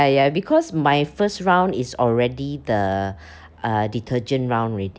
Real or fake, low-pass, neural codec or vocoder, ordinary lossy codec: real; none; none; none